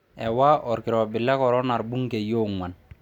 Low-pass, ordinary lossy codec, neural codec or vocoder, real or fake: 19.8 kHz; none; none; real